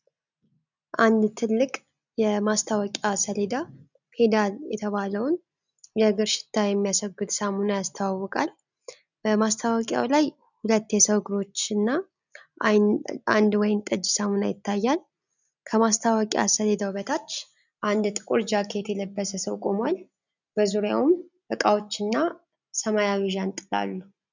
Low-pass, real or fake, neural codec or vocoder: 7.2 kHz; real; none